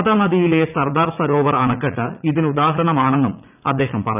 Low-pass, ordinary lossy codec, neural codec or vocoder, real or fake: 3.6 kHz; none; vocoder, 44.1 kHz, 80 mel bands, Vocos; fake